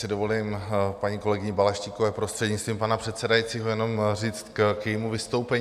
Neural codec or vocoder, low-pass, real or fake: none; 14.4 kHz; real